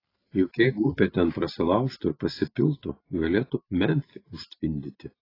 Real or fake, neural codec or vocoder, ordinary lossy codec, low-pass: real; none; AAC, 24 kbps; 5.4 kHz